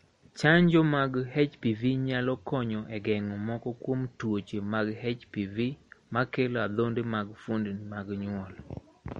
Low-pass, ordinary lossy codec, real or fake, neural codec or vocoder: 19.8 kHz; MP3, 48 kbps; real; none